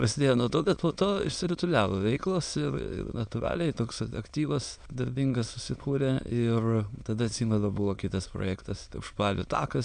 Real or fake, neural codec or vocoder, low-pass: fake; autoencoder, 22.05 kHz, a latent of 192 numbers a frame, VITS, trained on many speakers; 9.9 kHz